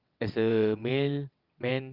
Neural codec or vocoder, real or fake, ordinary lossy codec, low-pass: vocoder, 22.05 kHz, 80 mel bands, WaveNeXt; fake; Opus, 24 kbps; 5.4 kHz